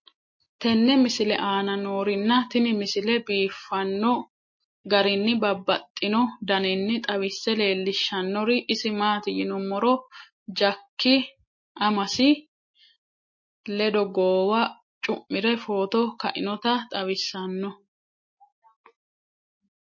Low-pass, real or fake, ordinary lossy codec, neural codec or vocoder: 7.2 kHz; real; MP3, 32 kbps; none